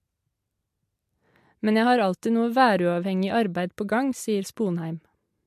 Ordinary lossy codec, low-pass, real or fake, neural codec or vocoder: MP3, 64 kbps; 14.4 kHz; real; none